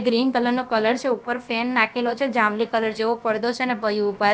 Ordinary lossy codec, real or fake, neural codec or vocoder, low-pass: none; fake; codec, 16 kHz, about 1 kbps, DyCAST, with the encoder's durations; none